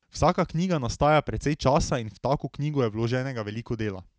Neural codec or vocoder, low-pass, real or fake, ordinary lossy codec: none; none; real; none